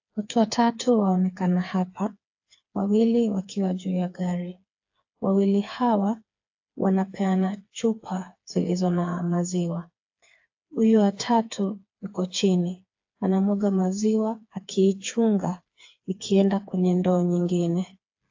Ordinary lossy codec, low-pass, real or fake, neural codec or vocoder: AAC, 48 kbps; 7.2 kHz; fake; codec, 16 kHz, 4 kbps, FreqCodec, smaller model